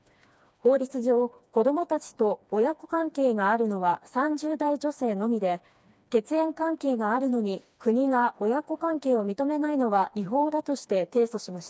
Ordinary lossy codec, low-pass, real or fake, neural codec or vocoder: none; none; fake; codec, 16 kHz, 2 kbps, FreqCodec, smaller model